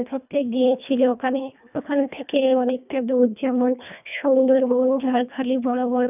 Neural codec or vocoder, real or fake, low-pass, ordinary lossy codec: codec, 24 kHz, 1.5 kbps, HILCodec; fake; 3.6 kHz; none